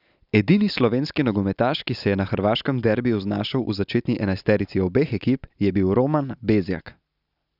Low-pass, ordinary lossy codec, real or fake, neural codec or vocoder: 5.4 kHz; none; real; none